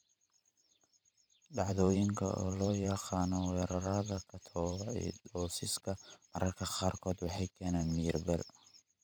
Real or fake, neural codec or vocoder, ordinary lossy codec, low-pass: fake; vocoder, 44.1 kHz, 128 mel bands every 512 samples, BigVGAN v2; none; none